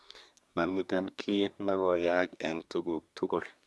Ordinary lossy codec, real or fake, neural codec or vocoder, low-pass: none; fake; codec, 24 kHz, 1 kbps, SNAC; none